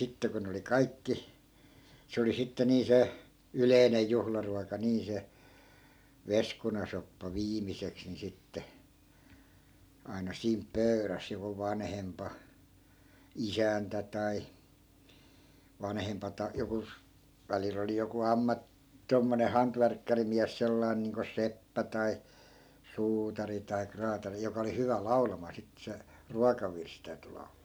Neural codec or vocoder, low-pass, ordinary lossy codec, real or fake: none; none; none; real